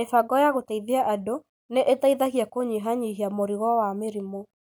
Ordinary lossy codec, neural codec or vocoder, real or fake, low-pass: none; none; real; none